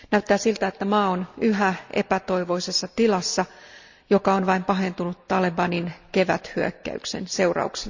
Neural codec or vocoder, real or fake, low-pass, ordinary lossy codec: none; real; 7.2 kHz; Opus, 64 kbps